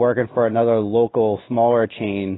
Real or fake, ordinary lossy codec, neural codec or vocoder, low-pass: real; AAC, 16 kbps; none; 7.2 kHz